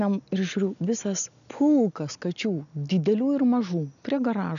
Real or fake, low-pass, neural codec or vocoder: real; 7.2 kHz; none